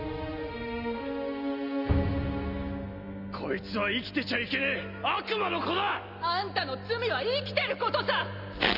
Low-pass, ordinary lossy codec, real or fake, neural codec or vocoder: 5.4 kHz; none; real; none